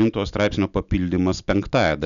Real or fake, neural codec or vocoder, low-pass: real; none; 7.2 kHz